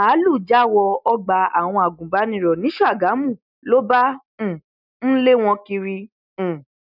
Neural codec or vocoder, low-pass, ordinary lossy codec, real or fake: none; 5.4 kHz; none; real